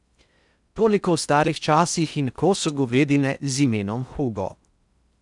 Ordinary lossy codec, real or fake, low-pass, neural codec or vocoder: none; fake; 10.8 kHz; codec, 16 kHz in and 24 kHz out, 0.6 kbps, FocalCodec, streaming, 4096 codes